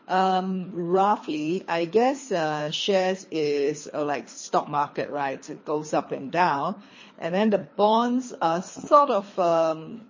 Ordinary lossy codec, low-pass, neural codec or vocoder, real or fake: MP3, 32 kbps; 7.2 kHz; codec, 24 kHz, 3 kbps, HILCodec; fake